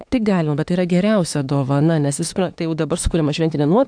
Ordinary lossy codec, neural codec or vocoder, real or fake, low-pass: AAC, 64 kbps; autoencoder, 48 kHz, 32 numbers a frame, DAC-VAE, trained on Japanese speech; fake; 9.9 kHz